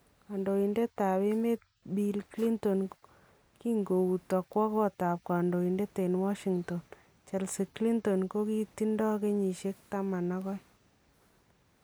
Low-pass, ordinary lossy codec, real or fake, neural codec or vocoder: none; none; real; none